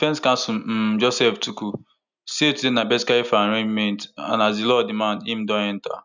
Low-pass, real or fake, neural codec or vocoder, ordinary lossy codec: 7.2 kHz; real; none; none